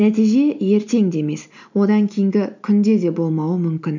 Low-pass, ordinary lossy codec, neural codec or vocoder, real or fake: 7.2 kHz; none; none; real